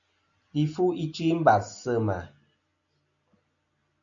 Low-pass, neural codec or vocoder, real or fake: 7.2 kHz; none; real